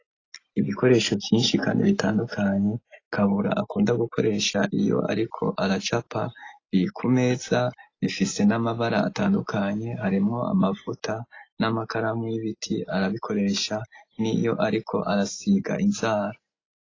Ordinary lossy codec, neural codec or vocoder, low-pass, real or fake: AAC, 32 kbps; none; 7.2 kHz; real